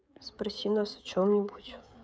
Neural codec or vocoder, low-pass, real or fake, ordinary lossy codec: codec, 16 kHz, 16 kbps, FreqCodec, smaller model; none; fake; none